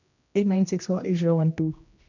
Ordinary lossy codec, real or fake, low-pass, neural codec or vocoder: none; fake; 7.2 kHz; codec, 16 kHz, 1 kbps, X-Codec, HuBERT features, trained on general audio